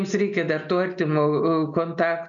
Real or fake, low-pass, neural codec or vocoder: real; 7.2 kHz; none